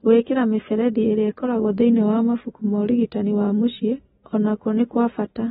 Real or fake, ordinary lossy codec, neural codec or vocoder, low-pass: real; AAC, 16 kbps; none; 19.8 kHz